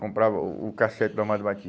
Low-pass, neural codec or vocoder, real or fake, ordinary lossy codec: none; none; real; none